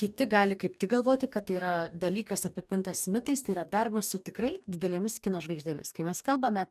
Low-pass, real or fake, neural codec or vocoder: 14.4 kHz; fake; codec, 44.1 kHz, 2.6 kbps, DAC